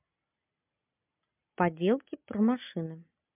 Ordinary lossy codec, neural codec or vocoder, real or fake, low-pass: MP3, 32 kbps; none; real; 3.6 kHz